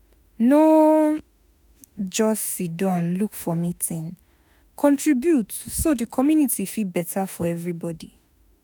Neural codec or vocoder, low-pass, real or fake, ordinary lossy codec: autoencoder, 48 kHz, 32 numbers a frame, DAC-VAE, trained on Japanese speech; none; fake; none